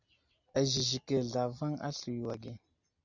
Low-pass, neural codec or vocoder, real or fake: 7.2 kHz; none; real